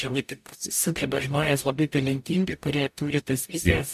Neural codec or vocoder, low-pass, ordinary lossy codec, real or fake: codec, 44.1 kHz, 0.9 kbps, DAC; 14.4 kHz; AAC, 96 kbps; fake